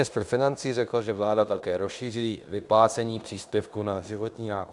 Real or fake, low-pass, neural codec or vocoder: fake; 10.8 kHz; codec, 16 kHz in and 24 kHz out, 0.9 kbps, LongCat-Audio-Codec, fine tuned four codebook decoder